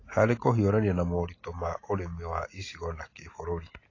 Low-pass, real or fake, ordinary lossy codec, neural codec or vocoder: 7.2 kHz; real; AAC, 32 kbps; none